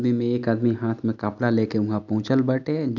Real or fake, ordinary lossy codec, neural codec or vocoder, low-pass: real; none; none; 7.2 kHz